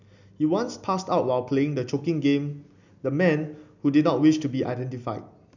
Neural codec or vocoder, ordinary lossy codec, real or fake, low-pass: none; none; real; 7.2 kHz